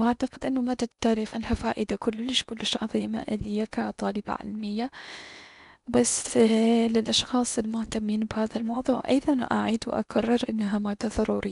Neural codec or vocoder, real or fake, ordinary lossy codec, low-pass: codec, 16 kHz in and 24 kHz out, 0.8 kbps, FocalCodec, streaming, 65536 codes; fake; none; 10.8 kHz